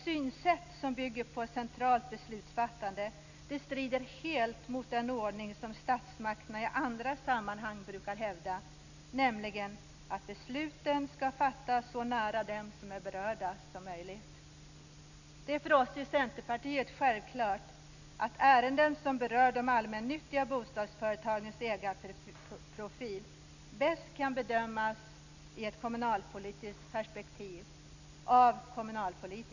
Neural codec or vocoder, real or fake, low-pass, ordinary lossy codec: none; real; 7.2 kHz; none